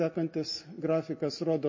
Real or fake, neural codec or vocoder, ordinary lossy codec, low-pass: real; none; MP3, 32 kbps; 7.2 kHz